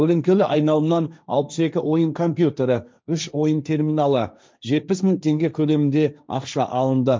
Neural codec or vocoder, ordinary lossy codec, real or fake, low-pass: codec, 16 kHz, 1.1 kbps, Voila-Tokenizer; none; fake; none